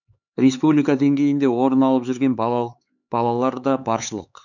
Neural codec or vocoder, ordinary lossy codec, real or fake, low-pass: codec, 16 kHz, 4 kbps, X-Codec, HuBERT features, trained on LibriSpeech; none; fake; 7.2 kHz